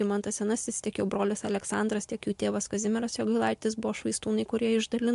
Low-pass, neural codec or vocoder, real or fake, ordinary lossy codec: 10.8 kHz; none; real; MP3, 64 kbps